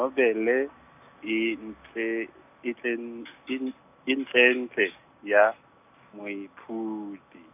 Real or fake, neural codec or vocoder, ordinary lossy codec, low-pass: real; none; none; 3.6 kHz